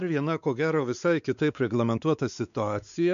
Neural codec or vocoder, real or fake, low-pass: codec, 16 kHz, 4 kbps, X-Codec, WavLM features, trained on Multilingual LibriSpeech; fake; 7.2 kHz